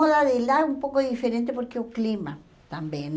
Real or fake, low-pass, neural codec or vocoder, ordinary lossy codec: real; none; none; none